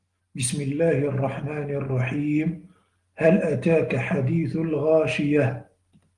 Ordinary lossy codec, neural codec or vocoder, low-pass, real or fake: Opus, 24 kbps; none; 10.8 kHz; real